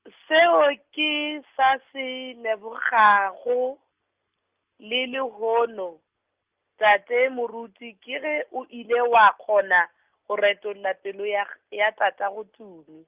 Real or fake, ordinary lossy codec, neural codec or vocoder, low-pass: real; Opus, 24 kbps; none; 3.6 kHz